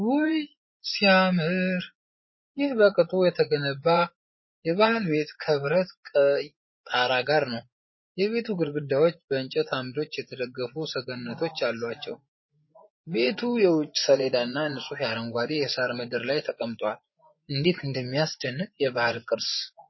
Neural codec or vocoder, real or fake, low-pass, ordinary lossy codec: vocoder, 24 kHz, 100 mel bands, Vocos; fake; 7.2 kHz; MP3, 24 kbps